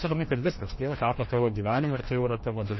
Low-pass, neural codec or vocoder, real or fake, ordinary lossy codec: 7.2 kHz; codec, 16 kHz, 1 kbps, FreqCodec, larger model; fake; MP3, 24 kbps